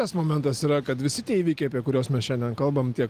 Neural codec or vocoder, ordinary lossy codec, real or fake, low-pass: none; Opus, 24 kbps; real; 14.4 kHz